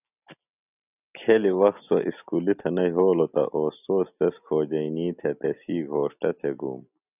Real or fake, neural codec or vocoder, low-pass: real; none; 3.6 kHz